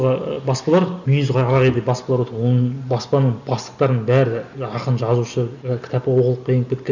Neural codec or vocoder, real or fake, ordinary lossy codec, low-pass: none; real; none; 7.2 kHz